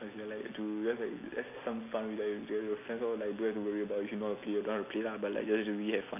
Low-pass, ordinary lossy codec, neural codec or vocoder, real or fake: 3.6 kHz; none; none; real